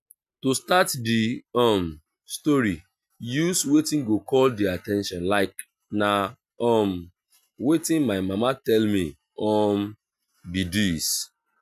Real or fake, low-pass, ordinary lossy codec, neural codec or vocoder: real; 14.4 kHz; none; none